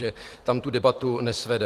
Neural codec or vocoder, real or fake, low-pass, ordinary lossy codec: vocoder, 44.1 kHz, 128 mel bands every 256 samples, BigVGAN v2; fake; 14.4 kHz; Opus, 24 kbps